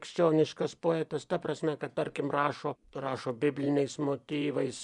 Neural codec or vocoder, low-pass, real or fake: vocoder, 24 kHz, 100 mel bands, Vocos; 10.8 kHz; fake